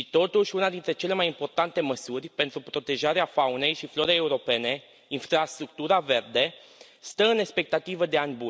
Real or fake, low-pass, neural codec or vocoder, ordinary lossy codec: real; none; none; none